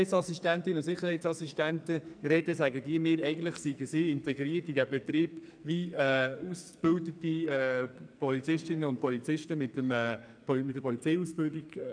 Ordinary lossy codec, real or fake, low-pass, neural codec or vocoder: none; fake; 9.9 kHz; codec, 44.1 kHz, 2.6 kbps, SNAC